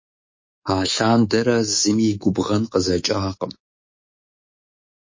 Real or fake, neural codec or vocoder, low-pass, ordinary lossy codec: fake; codec, 24 kHz, 3.1 kbps, DualCodec; 7.2 kHz; MP3, 32 kbps